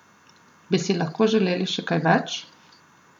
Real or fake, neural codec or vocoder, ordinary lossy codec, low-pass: real; none; none; 19.8 kHz